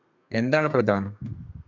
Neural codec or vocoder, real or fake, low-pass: codec, 32 kHz, 1.9 kbps, SNAC; fake; 7.2 kHz